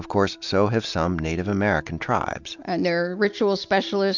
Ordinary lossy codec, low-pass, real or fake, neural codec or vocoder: MP3, 64 kbps; 7.2 kHz; real; none